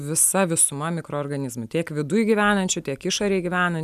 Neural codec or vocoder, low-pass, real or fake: none; 14.4 kHz; real